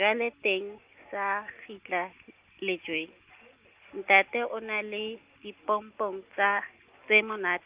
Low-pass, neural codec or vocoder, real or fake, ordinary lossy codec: 3.6 kHz; none; real; Opus, 32 kbps